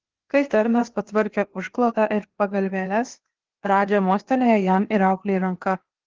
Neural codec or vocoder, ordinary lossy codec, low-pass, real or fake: codec, 16 kHz, 0.8 kbps, ZipCodec; Opus, 16 kbps; 7.2 kHz; fake